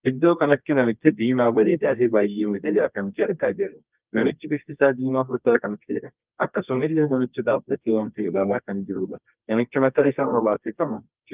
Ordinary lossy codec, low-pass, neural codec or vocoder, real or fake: Opus, 32 kbps; 3.6 kHz; codec, 24 kHz, 0.9 kbps, WavTokenizer, medium music audio release; fake